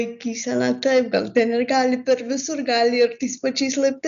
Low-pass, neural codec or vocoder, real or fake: 7.2 kHz; none; real